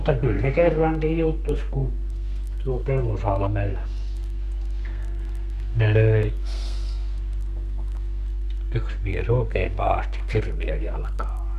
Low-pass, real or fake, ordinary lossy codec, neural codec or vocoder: 14.4 kHz; fake; none; codec, 32 kHz, 1.9 kbps, SNAC